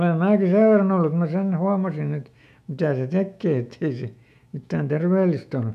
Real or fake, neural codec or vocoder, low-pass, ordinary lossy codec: fake; autoencoder, 48 kHz, 128 numbers a frame, DAC-VAE, trained on Japanese speech; 14.4 kHz; none